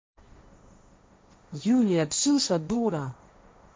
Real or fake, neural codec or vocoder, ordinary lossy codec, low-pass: fake; codec, 16 kHz, 1.1 kbps, Voila-Tokenizer; none; none